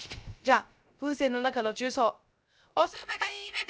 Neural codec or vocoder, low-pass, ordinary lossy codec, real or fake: codec, 16 kHz, 0.3 kbps, FocalCodec; none; none; fake